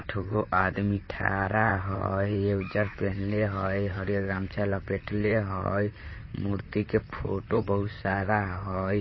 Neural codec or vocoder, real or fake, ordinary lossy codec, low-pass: vocoder, 44.1 kHz, 128 mel bands, Pupu-Vocoder; fake; MP3, 24 kbps; 7.2 kHz